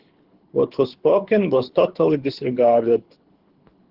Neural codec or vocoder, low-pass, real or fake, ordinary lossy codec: codec, 24 kHz, 6 kbps, HILCodec; 5.4 kHz; fake; Opus, 16 kbps